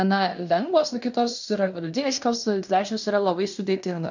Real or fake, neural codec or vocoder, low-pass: fake; codec, 16 kHz in and 24 kHz out, 0.9 kbps, LongCat-Audio-Codec, fine tuned four codebook decoder; 7.2 kHz